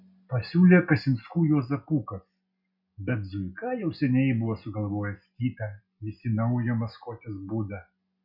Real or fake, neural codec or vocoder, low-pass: real; none; 5.4 kHz